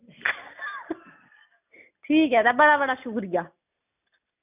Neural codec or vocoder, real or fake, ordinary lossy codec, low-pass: none; real; none; 3.6 kHz